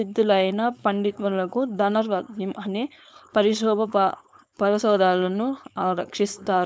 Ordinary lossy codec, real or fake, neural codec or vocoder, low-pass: none; fake; codec, 16 kHz, 4.8 kbps, FACodec; none